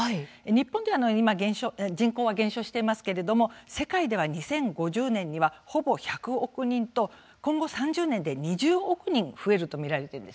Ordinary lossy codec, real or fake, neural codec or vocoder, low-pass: none; real; none; none